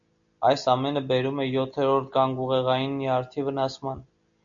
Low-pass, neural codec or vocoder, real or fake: 7.2 kHz; none; real